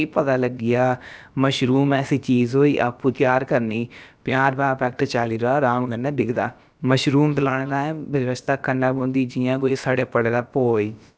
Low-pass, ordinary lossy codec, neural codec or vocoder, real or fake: none; none; codec, 16 kHz, about 1 kbps, DyCAST, with the encoder's durations; fake